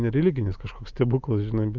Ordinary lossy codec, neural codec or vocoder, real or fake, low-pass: Opus, 24 kbps; none; real; 7.2 kHz